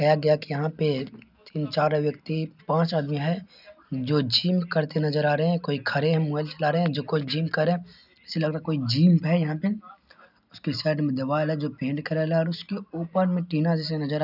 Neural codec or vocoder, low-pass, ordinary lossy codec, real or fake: none; 5.4 kHz; none; real